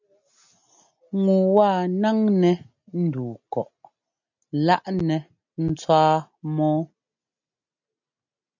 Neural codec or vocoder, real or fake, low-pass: none; real; 7.2 kHz